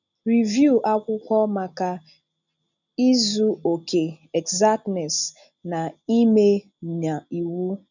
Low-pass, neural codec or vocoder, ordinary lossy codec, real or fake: 7.2 kHz; none; none; real